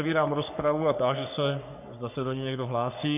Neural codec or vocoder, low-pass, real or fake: codec, 44.1 kHz, 3.4 kbps, Pupu-Codec; 3.6 kHz; fake